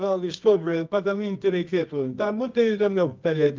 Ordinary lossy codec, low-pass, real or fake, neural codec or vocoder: Opus, 24 kbps; 7.2 kHz; fake; codec, 24 kHz, 0.9 kbps, WavTokenizer, medium music audio release